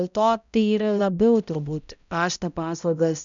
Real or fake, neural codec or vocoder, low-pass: fake; codec, 16 kHz, 0.5 kbps, X-Codec, HuBERT features, trained on balanced general audio; 7.2 kHz